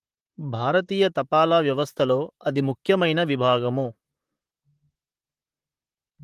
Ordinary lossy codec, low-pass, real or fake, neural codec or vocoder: Opus, 24 kbps; 14.4 kHz; fake; vocoder, 44.1 kHz, 128 mel bands, Pupu-Vocoder